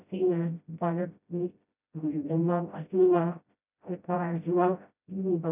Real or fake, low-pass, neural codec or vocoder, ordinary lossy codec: fake; 3.6 kHz; codec, 16 kHz, 0.5 kbps, FreqCodec, smaller model; none